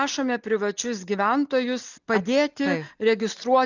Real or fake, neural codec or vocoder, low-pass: real; none; 7.2 kHz